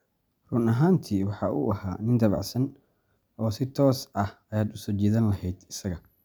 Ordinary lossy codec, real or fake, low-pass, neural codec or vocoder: none; real; none; none